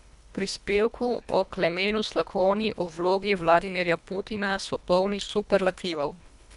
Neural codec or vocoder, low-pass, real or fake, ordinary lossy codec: codec, 24 kHz, 1.5 kbps, HILCodec; 10.8 kHz; fake; none